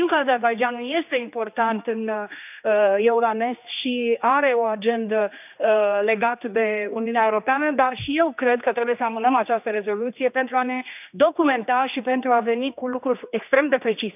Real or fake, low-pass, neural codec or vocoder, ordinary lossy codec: fake; 3.6 kHz; codec, 16 kHz, 2 kbps, X-Codec, HuBERT features, trained on general audio; none